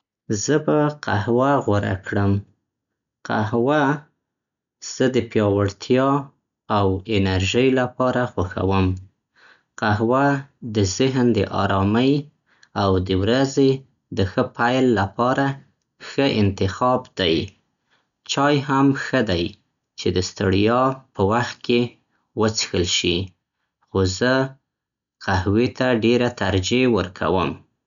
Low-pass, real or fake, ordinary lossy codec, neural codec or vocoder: 7.2 kHz; real; none; none